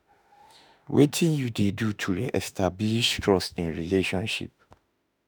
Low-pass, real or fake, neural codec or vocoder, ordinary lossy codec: none; fake; autoencoder, 48 kHz, 32 numbers a frame, DAC-VAE, trained on Japanese speech; none